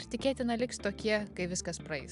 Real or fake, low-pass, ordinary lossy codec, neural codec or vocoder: real; 10.8 kHz; AAC, 96 kbps; none